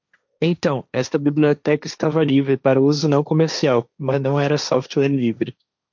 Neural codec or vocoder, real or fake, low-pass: codec, 16 kHz, 1.1 kbps, Voila-Tokenizer; fake; 7.2 kHz